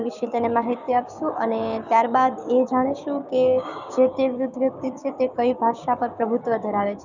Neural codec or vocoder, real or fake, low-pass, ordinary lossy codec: codec, 16 kHz, 6 kbps, DAC; fake; 7.2 kHz; none